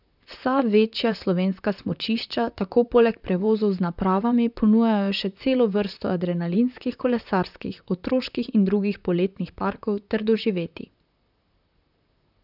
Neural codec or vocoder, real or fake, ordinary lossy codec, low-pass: vocoder, 44.1 kHz, 128 mel bands, Pupu-Vocoder; fake; none; 5.4 kHz